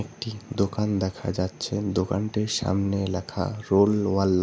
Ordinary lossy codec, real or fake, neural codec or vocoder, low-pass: none; real; none; none